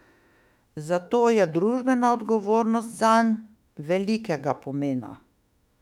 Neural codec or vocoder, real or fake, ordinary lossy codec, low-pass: autoencoder, 48 kHz, 32 numbers a frame, DAC-VAE, trained on Japanese speech; fake; none; 19.8 kHz